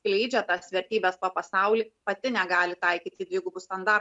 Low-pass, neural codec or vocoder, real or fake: 10.8 kHz; none; real